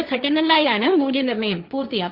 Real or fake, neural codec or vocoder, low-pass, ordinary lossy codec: fake; codec, 16 kHz, 1.1 kbps, Voila-Tokenizer; 5.4 kHz; Opus, 64 kbps